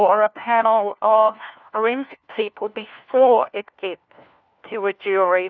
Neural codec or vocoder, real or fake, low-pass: codec, 16 kHz, 1 kbps, FunCodec, trained on LibriTTS, 50 frames a second; fake; 7.2 kHz